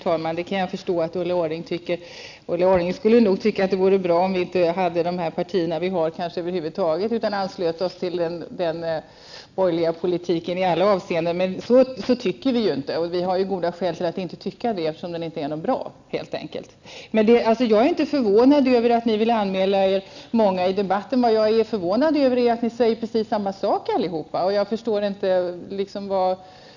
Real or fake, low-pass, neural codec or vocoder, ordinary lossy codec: real; 7.2 kHz; none; none